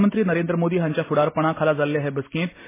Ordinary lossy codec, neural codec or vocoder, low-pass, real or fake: MP3, 16 kbps; none; 3.6 kHz; real